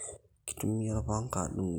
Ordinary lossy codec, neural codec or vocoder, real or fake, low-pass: none; none; real; none